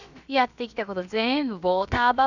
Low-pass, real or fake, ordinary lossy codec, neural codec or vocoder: 7.2 kHz; fake; none; codec, 16 kHz, about 1 kbps, DyCAST, with the encoder's durations